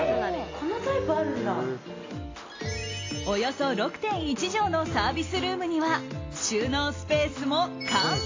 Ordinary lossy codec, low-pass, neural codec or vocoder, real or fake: AAC, 32 kbps; 7.2 kHz; none; real